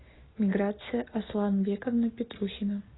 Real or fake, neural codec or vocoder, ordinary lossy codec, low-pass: real; none; AAC, 16 kbps; 7.2 kHz